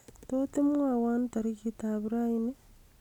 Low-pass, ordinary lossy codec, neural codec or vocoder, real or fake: 19.8 kHz; none; none; real